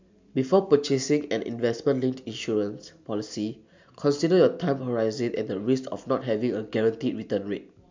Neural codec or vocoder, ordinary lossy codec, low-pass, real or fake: none; MP3, 64 kbps; 7.2 kHz; real